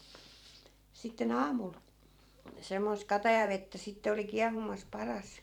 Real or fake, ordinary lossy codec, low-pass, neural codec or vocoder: fake; none; 19.8 kHz; vocoder, 44.1 kHz, 128 mel bands every 512 samples, BigVGAN v2